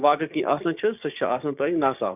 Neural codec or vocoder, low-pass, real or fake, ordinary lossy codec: codec, 16 kHz, 6 kbps, DAC; 3.6 kHz; fake; none